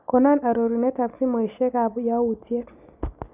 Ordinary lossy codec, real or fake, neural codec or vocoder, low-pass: none; real; none; 3.6 kHz